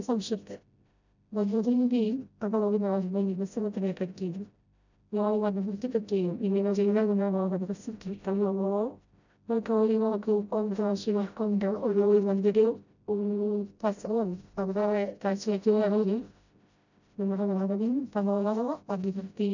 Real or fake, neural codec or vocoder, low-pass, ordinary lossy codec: fake; codec, 16 kHz, 0.5 kbps, FreqCodec, smaller model; 7.2 kHz; none